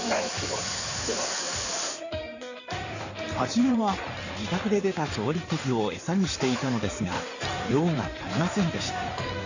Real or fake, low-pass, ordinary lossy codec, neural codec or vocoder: fake; 7.2 kHz; none; codec, 16 kHz in and 24 kHz out, 2.2 kbps, FireRedTTS-2 codec